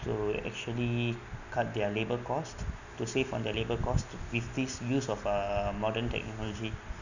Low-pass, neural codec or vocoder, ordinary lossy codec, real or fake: 7.2 kHz; none; none; real